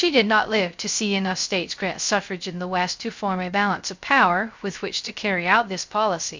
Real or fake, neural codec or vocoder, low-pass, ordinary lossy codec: fake; codec, 16 kHz, 0.3 kbps, FocalCodec; 7.2 kHz; MP3, 64 kbps